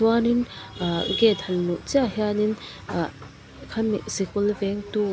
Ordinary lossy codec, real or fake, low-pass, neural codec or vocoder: none; real; none; none